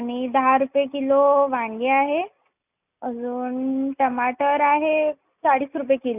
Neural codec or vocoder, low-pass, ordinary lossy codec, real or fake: none; 3.6 kHz; none; real